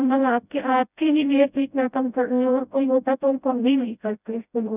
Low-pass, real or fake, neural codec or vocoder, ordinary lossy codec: 3.6 kHz; fake; codec, 16 kHz, 0.5 kbps, FreqCodec, smaller model; none